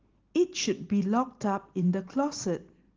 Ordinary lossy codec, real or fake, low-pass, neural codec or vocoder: Opus, 16 kbps; real; 7.2 kHz; none